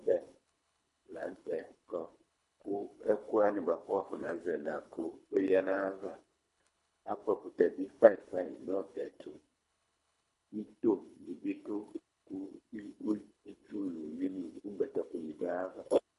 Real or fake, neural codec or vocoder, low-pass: fake; codec, 24 kHz, 3 kbps, HILCodec; 10.8 kHz